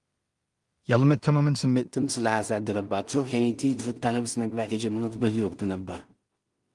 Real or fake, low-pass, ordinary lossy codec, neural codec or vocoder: fake; 10.8 kHz; Opus, 24 kbps; codec, 16 kHz in and 24 kHz out, 0.4 kbps, LongCat-Audio-Codec, two codebook decoder